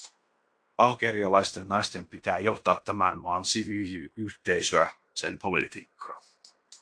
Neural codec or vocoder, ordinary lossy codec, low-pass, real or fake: codec, 16 kHz in and 24 kHz out, 0.9 kbps, LongCat-Audio-Codec, fine tuned four codebook decoder; AAC, 64 kbps; 9.9 kHz; fake